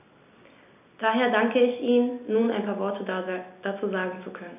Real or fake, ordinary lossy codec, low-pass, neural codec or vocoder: real; none; 3.6 kHz; none